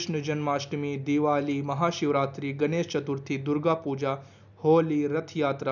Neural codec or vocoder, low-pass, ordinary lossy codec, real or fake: none; 7.2 kHz; none; real